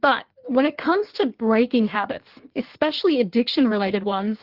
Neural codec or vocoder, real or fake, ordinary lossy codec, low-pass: codec, 16 kHz in and 24 kHz out, 1.1 kbps, FireRedTTS-2 codec; fake; Opus, 16 kbps; 5.4 kHz